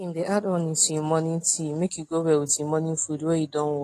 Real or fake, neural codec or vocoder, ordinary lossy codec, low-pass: real; none; AAC, 48 kbps; 14.4 kHz